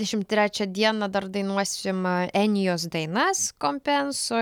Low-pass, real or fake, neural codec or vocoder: 19.8 kHz; real; none